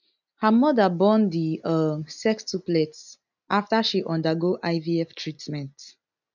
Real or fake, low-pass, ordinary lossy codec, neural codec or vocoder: real; 7.2 kHz; none; none